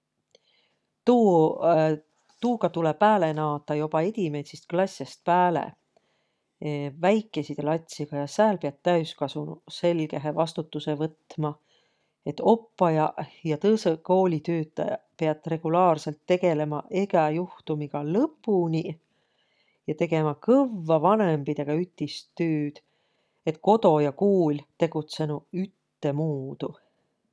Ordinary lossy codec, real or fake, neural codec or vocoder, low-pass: none; real; none; none